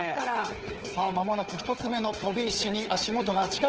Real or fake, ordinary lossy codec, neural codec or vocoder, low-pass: fake; Opus, 16 kbps; codec, 16 kHz, 4 kbps, FunCodec, trained on Chinese and English, 50 frames a second; 7.2 kHz